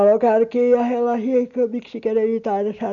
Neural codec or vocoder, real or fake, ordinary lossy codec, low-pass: none; real; none; 7.2 kHz